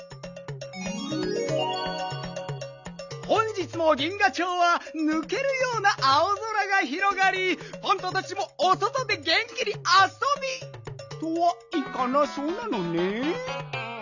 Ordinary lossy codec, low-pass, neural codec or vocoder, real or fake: none; 7.2 kHz; none; real